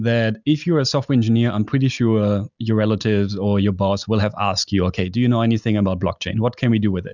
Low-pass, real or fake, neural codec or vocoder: 7.2 kHz; real; none